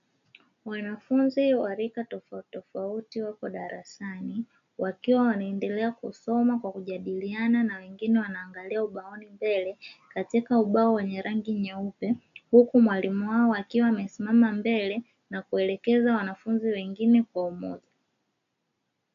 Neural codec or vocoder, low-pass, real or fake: none; 7.2 kHz; real